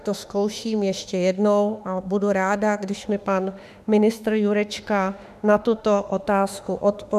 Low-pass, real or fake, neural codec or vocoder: 14.4 kHz; fake; autoencoder, 48 kHz, 32 numbers a frame, DAC-VAE, trained on Japanese speech